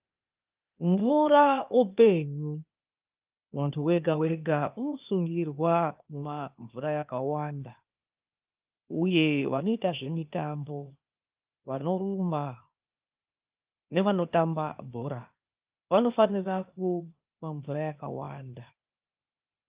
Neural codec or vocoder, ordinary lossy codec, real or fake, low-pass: codec, 16 kHz, 0.8 kbps, ZipCodec; Opus, 32 kbps; fake; 3.6 kHz